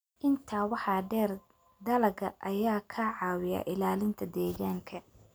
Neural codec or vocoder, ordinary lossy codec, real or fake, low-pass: none; none; real; none